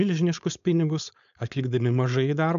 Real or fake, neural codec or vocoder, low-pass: fake; codec, 16 kHz, 4.8 kbps, FACodec; 7.2 kHz